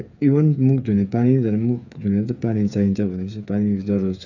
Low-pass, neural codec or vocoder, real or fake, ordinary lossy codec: 7.2 kHz; codec, 16 kHz, 8 kbps, FreqCodec, smaller model; fake; none